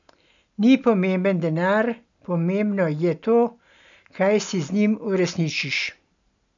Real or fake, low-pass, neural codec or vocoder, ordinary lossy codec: real; 7.2 kHz; none; none